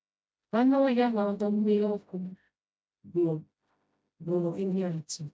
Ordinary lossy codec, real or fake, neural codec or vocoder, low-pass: none; fake; codec, 16 kHz, 0.5 kbps, FreqCodec, smaller model; none